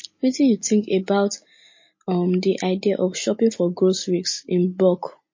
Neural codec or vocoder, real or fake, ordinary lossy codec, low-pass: none; real; MP3, 32 kbps; 7.2 kHz